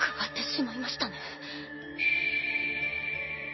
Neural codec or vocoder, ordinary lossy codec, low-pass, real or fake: none; MP3, 24 kbps; 7.2 kHz; real